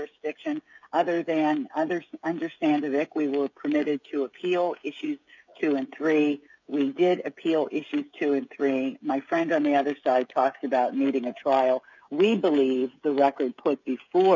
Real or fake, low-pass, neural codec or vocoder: fake; 7.2 kHz; codec, 16 kHz, 16 kbps, FreqCodec, smaller model